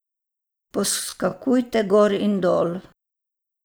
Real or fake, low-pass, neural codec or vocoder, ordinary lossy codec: real; none; none; none